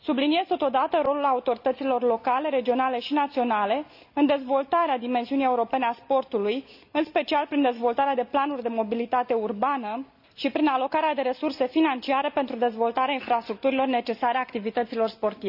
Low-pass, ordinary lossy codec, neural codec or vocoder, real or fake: 5.4 kHz; none; none; real